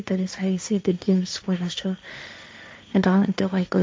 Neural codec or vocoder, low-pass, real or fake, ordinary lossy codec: codec, 16 kHz, 1.1 kbps, Voila-Tokenizer; none; fake; none